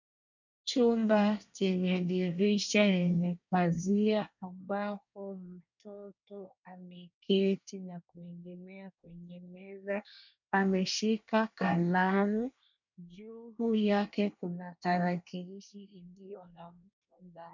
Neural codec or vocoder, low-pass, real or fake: codec, 24 kHz, 1 kbps, SNAC; 7.2 kHz; fake